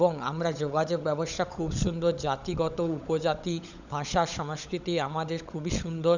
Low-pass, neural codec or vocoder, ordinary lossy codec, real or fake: 7.2 kHz; codec, 16 kHz, 16 kbps, FunCodec, trained on LibriTTS, 50 frames a second; none; fake